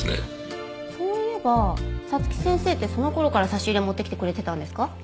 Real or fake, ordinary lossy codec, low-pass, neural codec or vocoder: real; none; none; none